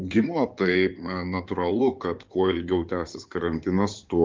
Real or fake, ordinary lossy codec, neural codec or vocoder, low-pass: fake; Opus, 24 kbps; codec, 16 kHz in and 24 kHz out, 2.2 kbps, FireRedTTS-2 codec; 7.2 kHz